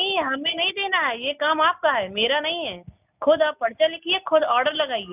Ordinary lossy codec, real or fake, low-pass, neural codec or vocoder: none; real; 3.6 kHz; none